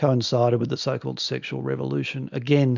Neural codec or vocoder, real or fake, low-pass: none; real; 7.2 kHz